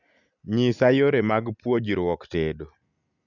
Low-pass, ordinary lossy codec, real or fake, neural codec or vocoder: 7.2 kHz; none; real; none